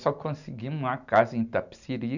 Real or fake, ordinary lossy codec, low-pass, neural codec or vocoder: real; none; 7.2 kHz; none